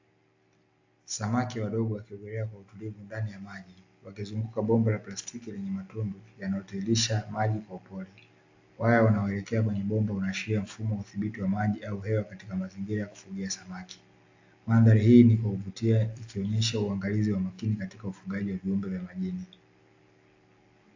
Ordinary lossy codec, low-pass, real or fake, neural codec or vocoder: AAC, 48 kbps; 7.2 kHz; real; none